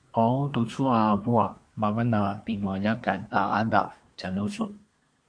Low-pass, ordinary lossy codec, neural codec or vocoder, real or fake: 9.9 kHz; MP3, 64 kbps; codec, 24 kHz, 1 kbps, SNAC; fake